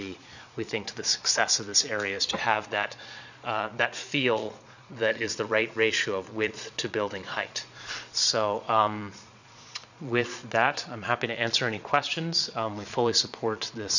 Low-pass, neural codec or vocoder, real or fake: 7.2 kHz; vocoder, 22.05 kHz, 80 mel bands, Vocos; fake